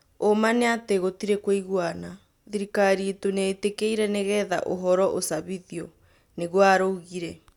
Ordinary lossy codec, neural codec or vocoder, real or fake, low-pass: none; none; real; 19.8 kHz